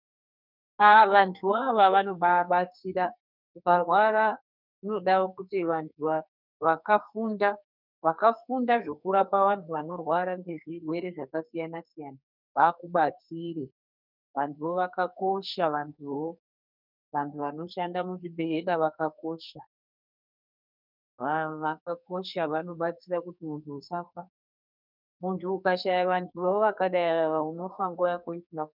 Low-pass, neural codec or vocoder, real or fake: 5.4 kHz; codec, 44.1 kHz, 2.6 kbps, SNAC; fake